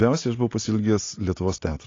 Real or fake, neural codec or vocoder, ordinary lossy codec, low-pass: real; none; AAC, 32 kbps; 7.2 kHz